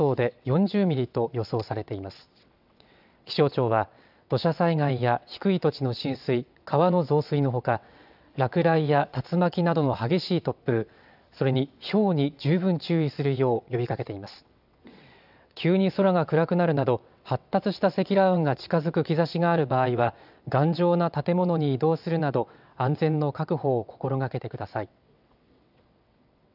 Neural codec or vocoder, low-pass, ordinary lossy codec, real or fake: vocoder, 22.05 kHz, 80 mel bands, WaveNeXt; 5.4 kHz; none; fake